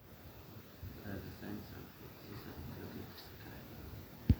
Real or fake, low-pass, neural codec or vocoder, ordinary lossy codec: real; none; none; none